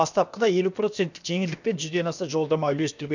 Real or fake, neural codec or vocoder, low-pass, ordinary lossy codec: fake; codec, 16 kHz, about 1 kbps, DyCAST, with the encoder's durations; 7.2 kHz; none